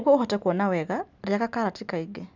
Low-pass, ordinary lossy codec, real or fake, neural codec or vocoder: 7.2 kHz; none; real; none